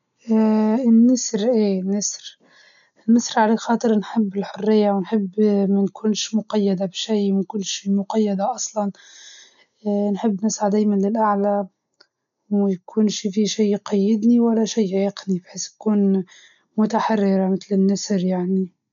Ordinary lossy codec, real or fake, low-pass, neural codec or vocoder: none; real; 7.2 kHz; none